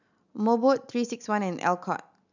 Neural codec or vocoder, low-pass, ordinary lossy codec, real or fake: none; 7.2 kHz; none; real